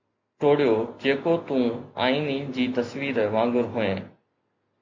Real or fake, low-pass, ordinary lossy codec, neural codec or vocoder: real; 7.2 kHz; MP3, 48 kbps; none